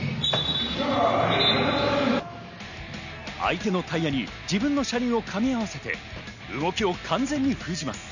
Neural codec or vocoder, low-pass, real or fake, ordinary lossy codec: none; 7.2 kHz; real; none